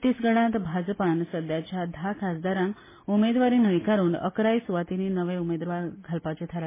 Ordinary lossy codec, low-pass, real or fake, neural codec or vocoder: MP3, 16 kbps; 3.6 kHz; real; none